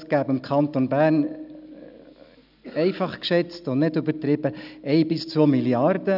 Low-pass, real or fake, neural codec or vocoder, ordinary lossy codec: 5.4 kHz; real; none; none